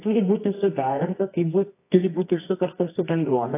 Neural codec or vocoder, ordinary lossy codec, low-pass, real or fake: codec, 24 kHz, 0.9 kbps, WavTokenizer, medium music audio release; AAC, 24 kbps; 3.6 kHz; fake